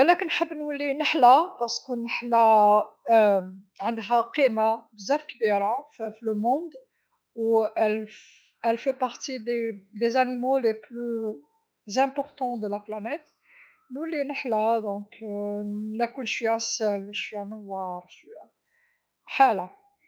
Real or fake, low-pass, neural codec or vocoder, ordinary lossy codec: fake; none; autoencoder, 48 kHz, 32 numbers a frame, DAC-VAE, trained on Japanese speech; none